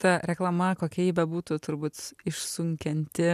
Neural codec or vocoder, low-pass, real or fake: none; 14.4 kHz; real